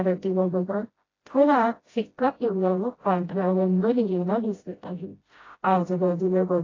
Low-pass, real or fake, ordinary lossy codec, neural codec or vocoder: 7.2 kHz; fake; AAC, 32 kbps; codec, 16 kHz, 0.5 kbps, FreqCodec, smaller model